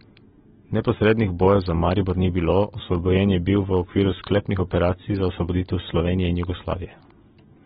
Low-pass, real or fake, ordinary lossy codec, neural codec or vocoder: 19.8 kHz; real; AAC, 16 kbps; none